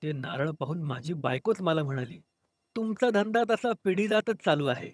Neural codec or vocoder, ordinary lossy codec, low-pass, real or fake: vocoder, 22.05 kHz, 80 mel bands, HiFi-GAN; none; none; fake